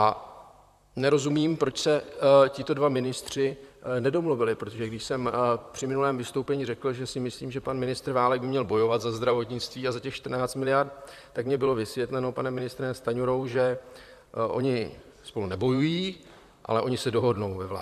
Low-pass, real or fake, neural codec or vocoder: 14.4 kHz; fake; vocoder, 44.1 kHz, 128 mel bands, Pupu-Vocoder